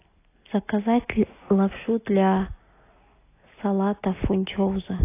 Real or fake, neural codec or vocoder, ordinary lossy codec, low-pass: real; none; AAC, 24 kbps; 3.6 kHz